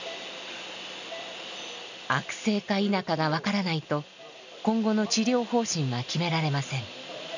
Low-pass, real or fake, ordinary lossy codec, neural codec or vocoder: 7.2 kHz; real; none; none